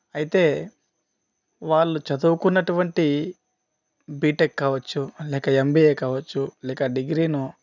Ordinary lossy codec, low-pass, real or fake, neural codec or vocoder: none; 7.2 kHz; real; none